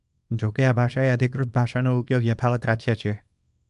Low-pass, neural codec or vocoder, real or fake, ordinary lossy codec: 10.8 kHz; codec, 24 kHz, 0.9 kbps, WavTokenizer, small release; fake; AAC, 96 kbps